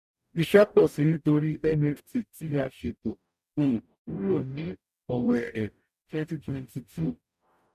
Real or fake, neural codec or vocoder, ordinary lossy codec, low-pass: fake; codec, 44.1 kHz, 0.9 kbps, DAC; none; 14.4 kHz